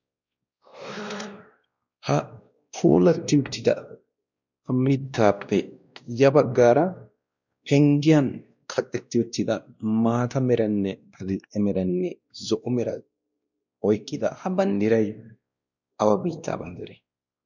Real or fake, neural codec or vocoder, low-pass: fake; codec, 16 kHz, 1 kbps, X-Codec, WavLM features, trained on Multilingual LibriSpeech; 7.2 kHz